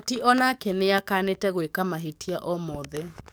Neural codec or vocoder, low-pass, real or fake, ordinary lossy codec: codec, 44.1 kHz, 7.8 kbps, DAC; none; fake; none